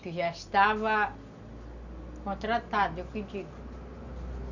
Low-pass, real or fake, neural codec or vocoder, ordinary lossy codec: 7.2 kHz; real; none; none